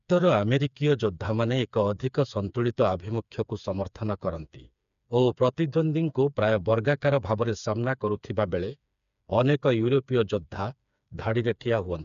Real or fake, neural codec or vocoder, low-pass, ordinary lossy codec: fake; codec, 16 kHz, 4 kbps, FreqCodec, smaller model; 7.2 kHz; none